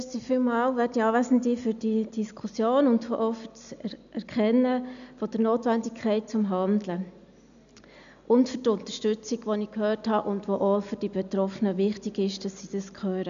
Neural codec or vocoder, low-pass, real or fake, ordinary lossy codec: none; 7.2 kHz; real; none